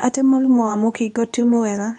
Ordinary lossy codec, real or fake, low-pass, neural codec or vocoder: none; fake; 10.8 kHz; codec, 24 kHz, 0.9 kbps, WavTokenizer, medium speech release version 2